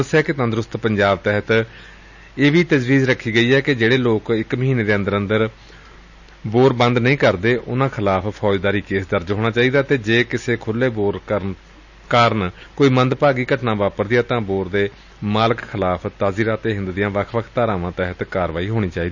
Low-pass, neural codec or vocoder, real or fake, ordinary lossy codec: 7.2 kHz; none; real; none